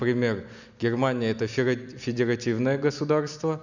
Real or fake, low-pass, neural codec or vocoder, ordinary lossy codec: real; 7.2 kHz; none; none